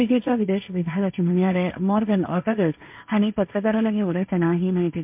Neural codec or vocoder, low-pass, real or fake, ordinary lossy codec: codec, 16 kHz, 1.1 kbps, Voila-Tokenizer; 3.6 kHz; fake; MP3, 32 kbps